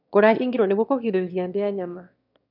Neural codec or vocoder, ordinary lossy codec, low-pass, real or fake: autoencoder, 22.05 kHz, a latent of 192 numbers a frame, VITS, trained on one speaker; none; 5.4 kHz; fake